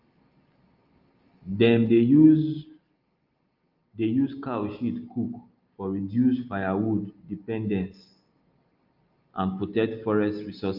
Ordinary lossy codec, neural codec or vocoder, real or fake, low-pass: Opus, 24 kbps; none; real; 5.4 kHz